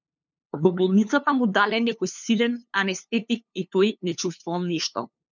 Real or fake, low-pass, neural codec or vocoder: fake; 7.2 kHz; codec, 16 kHz, 2 kbps, FunCodec, trained on LibriTTS, 25 frames a second